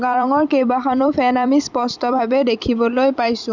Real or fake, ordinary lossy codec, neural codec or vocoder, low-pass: fake; Opus, 64 kbps; vocoder, 44.1 kHz, 128 mel bands every 256 samples, BigVGAN v2; 7.2 kHz